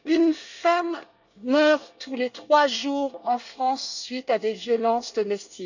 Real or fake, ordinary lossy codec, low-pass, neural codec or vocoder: fake; none; 7.2 kHz; codec, 24 kHz, 1 kbps, SNAC